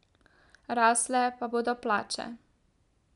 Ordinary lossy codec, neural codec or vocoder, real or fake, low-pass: none; none; real; 10.8 kHz